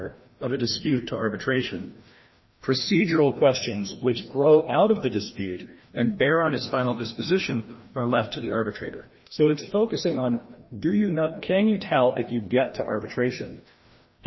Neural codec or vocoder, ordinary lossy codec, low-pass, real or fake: codec, 16 kHz, 1 kbps, FreqCodec, larger model; MP3, 24 kbps; 7.2 kHz; fake